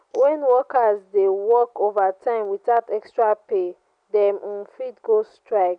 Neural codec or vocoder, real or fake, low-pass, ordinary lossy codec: none; real; 9.9 kHz; none